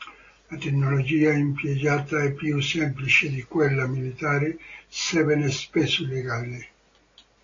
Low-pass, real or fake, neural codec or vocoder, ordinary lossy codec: 7.2 kHz; real; none; AAC, 32 kbps